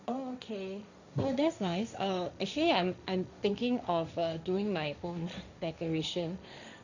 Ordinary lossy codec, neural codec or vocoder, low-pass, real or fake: none; codec, 16 kHz, 1.1 kbps, Voila-Tokenizer; 7.2 kHz; fake